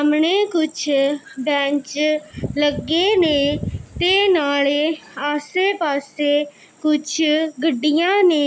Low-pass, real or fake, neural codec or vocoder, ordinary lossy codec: none; real; none; none